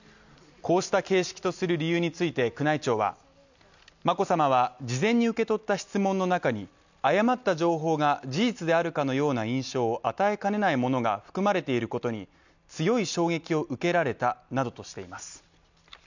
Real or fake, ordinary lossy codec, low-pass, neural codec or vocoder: real; none; 7.2 kHz; none